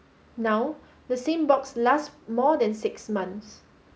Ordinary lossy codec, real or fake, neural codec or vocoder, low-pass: none; real; none; none